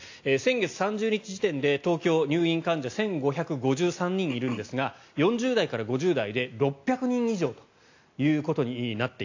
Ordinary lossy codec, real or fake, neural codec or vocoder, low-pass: AAC, 48 kbps; real; none; 7.2 kHz